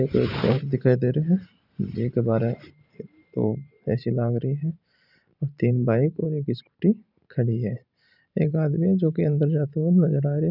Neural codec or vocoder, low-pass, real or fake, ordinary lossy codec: vocoder, 44.1 kHz, 128 mel bands every 512 samples, BigVGAN v2; 5.4 kHz; fake; none